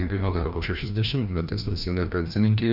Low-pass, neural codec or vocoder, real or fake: 5.4 kHz; codec, 16 kHz, 1 kbps, FreqCodec, larger model; fake